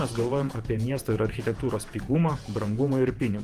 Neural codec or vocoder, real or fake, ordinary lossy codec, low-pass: vocoder, 48 kHz, 128 mel bands, Vocos; fake; Opus, 24 kbps; 14.4 kHz